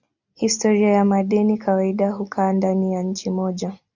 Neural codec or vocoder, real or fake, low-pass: none; real; 7.2 kHz